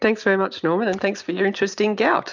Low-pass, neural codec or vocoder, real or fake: 7.2 kHz; none; real